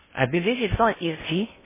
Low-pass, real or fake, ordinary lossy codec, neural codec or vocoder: 3.6 kHz; fake; MP3, 16 kbps; codec, 16 kHz in and 24 kHz out, 0.6 kbps, FocalCodec, streaming, 2048 codes